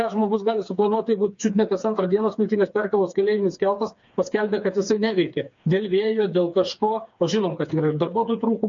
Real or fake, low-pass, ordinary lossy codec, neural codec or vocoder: fake; 7.2 kHz; MP3, 48 kbps; codec, 16 kHz, 4 kbps, FreqCodec, smaller model